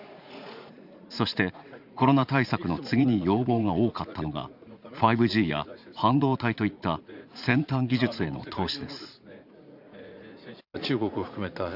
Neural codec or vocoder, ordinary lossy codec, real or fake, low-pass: vocoder, 44.1 kHz, 80 mel bands, Vocos; Opus, 64 kbps; fake; 5.4 kHz